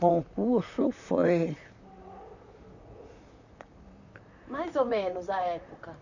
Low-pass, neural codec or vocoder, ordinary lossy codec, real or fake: 7.2 kHz; vocoder, 44.1 kHz, 128 mel bands, Pupu-Vocoder; none; fake